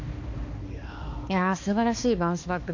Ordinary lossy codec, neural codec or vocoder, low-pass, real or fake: AAC, 48 kbps; codec, 16 kHz, 2 kbps, X-Codec, HuBERT features, trained on balanced general audio; 7.2 kHz; fake